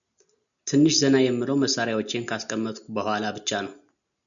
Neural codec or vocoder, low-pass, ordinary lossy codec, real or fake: none; 7.2 kHz; MP3, 96 kbps; real